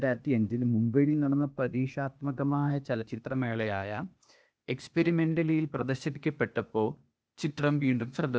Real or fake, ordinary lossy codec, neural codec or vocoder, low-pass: fake; none; codec, 16 kHz, 0.8 kbps, ZipCodec; none